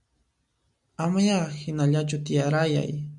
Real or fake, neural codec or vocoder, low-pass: real; none; 10.8 kHz